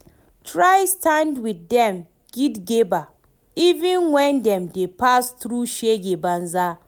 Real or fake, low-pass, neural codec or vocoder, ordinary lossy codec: real; none; none; none